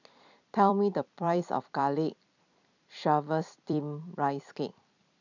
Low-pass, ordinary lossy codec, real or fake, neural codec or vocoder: 7.2 kHz; none; fake; vocoder, 44.1 kHz, 128 mel bands every 256 samples, BigVGAN v2